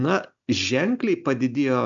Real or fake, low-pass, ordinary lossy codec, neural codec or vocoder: real; 7.2 kHz; MP3, 64 kbps; none